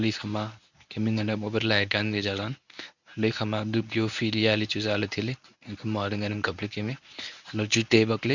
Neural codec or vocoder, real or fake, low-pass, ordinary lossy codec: codec, 24 kHz, 0.9 kbps, WavTokenizer, medium speech release version 1; fake; 7.2 kHz; none